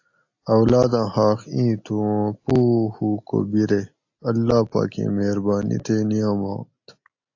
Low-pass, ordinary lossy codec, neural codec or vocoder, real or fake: 7.2 kHz; AAC, 48 kbps; none; real